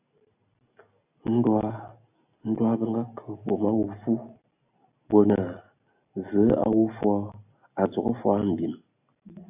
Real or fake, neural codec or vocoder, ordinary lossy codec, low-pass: real; none; AAC, 32 kbps; 3.6 kHz